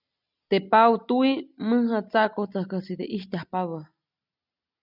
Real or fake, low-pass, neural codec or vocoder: real; 5.4 kHz; none